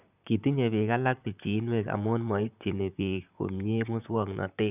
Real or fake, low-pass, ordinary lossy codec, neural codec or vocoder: fake; 3.6 kHz; none; vocoder, 44.1 kHz, 128 mel bands, Pupu-Vocoder